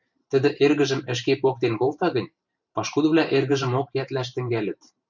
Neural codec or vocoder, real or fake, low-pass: vocoder, 44.1 kHz, 128 mel bands every 512 samples, BigVGAN v2; fake; 7.2 kHz